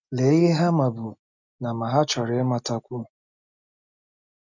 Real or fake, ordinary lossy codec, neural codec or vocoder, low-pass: real; none; none; 7.2 kHz